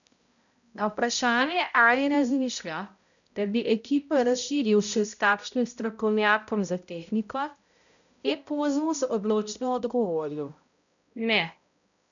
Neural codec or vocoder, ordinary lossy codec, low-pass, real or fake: codec, 16 kHz, 0.5 kbps, X-Codec, HuBERT features, trained on balanced general audio; MP3, 96 kbps; 7.2 kHz; fake